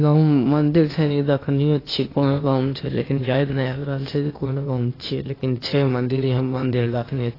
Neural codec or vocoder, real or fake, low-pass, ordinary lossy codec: codec, 16 kHz, 0.8 kbps, ZipCodec; fake; 5.4 kHz; AAC, 24 kbps